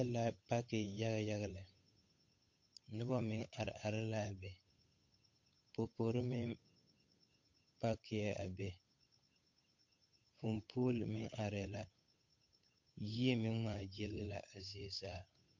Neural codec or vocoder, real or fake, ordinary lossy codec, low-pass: codec, 16 kHz, 8 kbps, FunCodec, trained on Chinese and English, 25 frames a second; fake; MP3, 48 kbps; 7.2 kHz